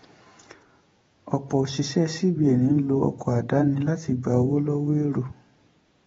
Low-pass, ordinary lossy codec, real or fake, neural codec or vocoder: 19.8 kHz; AAC, 24 kbps; fake; vocoder, 48 kHz, 128 mel bands, Vocos